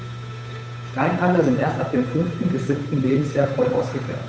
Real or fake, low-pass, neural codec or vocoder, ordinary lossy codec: fake; none; codec, 16 kHz, 8 kbps, FunCodec, trained on Chinese and English, 25 frames a second; none